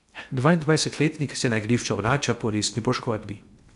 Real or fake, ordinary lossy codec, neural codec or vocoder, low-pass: fake; none; codec, 16 kHz in and 24 kHz out, 0.6 kbps, FocalCodec, streaming, 2048 codes; 10.8 kHz